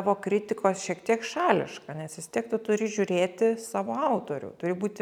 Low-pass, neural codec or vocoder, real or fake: 19.8 kHz; none; real